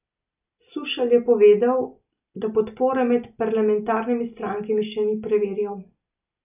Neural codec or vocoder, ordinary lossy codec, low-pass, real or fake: none; none; 3.6 kHz; real